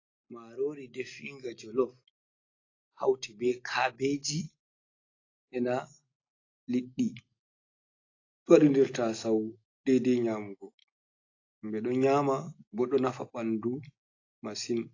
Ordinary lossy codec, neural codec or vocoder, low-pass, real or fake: AAC, 32 kbps; none; 7.2 kHz; real